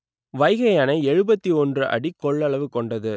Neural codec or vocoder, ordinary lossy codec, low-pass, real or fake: none; none; none; real